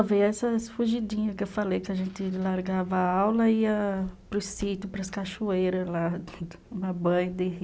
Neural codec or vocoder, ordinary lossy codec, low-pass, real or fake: none; none; none; real